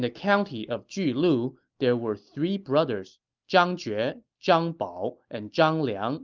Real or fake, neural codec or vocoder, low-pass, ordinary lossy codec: real; none; 7.2 kHz; Opus, 24 kbps